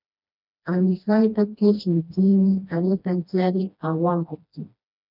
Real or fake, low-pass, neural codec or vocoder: fake; 5.4 kHz; codec, 16 kHz, 1 kbps, FreqCodec, smaller model